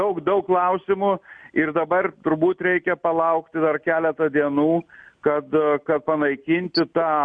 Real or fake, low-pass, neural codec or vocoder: real; 9.9 kHz; none